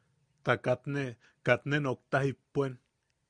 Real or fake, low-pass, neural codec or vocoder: real; 9.9 kHz; none